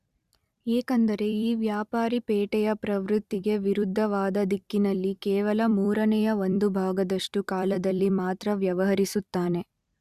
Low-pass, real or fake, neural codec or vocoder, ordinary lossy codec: 14.4 kHz; fake; vocoder, 44.1 kHz, 128 mel bands every 256 samples, BigVGAN v2; Opus, 64 kbps